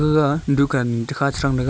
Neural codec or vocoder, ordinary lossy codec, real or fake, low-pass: none; none; real; none